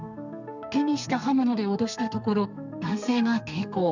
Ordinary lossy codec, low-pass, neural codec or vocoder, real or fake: none; 7.2 kHz; codec, 32 kHz, 1.9 kbps, SNAC; fake